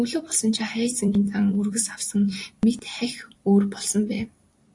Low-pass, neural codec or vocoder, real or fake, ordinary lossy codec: 10.8 kHz; vocoder, 44.1 kHz, 128 mel bands every 256 samples, BigVGAN v2; fake; AAC, 48 kbps